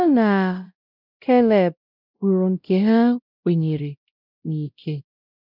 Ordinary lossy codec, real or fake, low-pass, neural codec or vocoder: none; fake; 5.4 kHz; codec, 16 kHz, 0.5 kbps, X-Codec, WavLM features, trained on Multilingual LibriSpeech